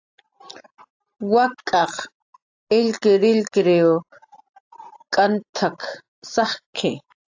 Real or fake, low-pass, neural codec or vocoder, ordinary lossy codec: real; 7.2 kHz; none; AAC, 48 kbps